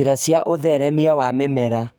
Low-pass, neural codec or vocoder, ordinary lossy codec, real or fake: none; codec, 44.1 kHz, 2.6 kbps, SNAC; none; fake